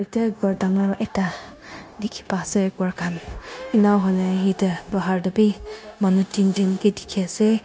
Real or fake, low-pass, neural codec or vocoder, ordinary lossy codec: fake; none; codec, 16 kHz, 0.9 kbps, LongCat-Audio-Codec; none